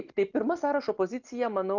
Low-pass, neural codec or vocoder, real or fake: 7.2 kHz; none; real